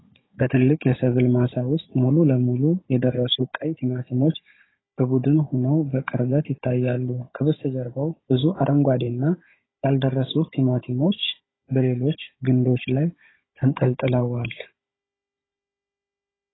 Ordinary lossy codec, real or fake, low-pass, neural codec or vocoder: AAC, 16 kbps; fake; 7.2 kHz; codec, 16 kHz, 16 kbps, FunCodec, trained on Chinese and English, 50 frames a second